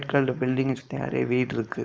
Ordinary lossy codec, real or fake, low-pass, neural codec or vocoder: none; fake; none; codec, 16 kHz, 4.8 kbps, FACodec